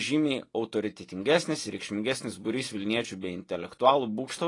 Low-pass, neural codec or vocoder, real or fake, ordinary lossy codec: 10.8 kHz; none; real; AAC, 32 kbps